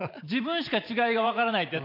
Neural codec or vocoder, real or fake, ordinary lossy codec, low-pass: vocoder, 44.1 kHz, 128 mel bands every 512 samples, BigVGAN v2; fake; none; 5.4 kHz